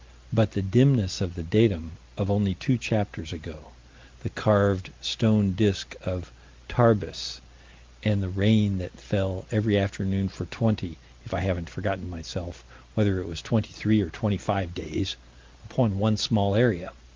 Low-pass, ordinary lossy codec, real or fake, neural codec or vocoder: 7.2 kHz; Opus, 24 kbps; real; none